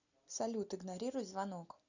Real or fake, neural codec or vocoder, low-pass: real; none; 7.2 kHz